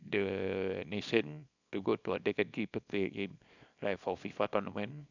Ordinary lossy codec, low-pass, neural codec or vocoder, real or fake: none; 7.2 kHz; codec, 24 kHz, 0.9 kbps, WavTokenizer, small release; fake